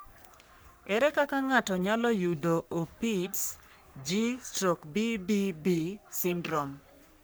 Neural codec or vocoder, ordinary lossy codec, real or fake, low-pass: codec, 44.1 kHz, 3.4 kbps, Pupu-Codec; none; fake; none